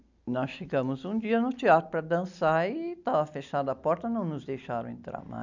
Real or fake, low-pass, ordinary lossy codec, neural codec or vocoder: real; 7.2 kHz; none; none